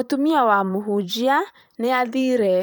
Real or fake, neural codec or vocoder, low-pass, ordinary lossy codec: fake; vocoder, 44.1 kHz, 128 mel bands, Pupu-Vocoder; none; none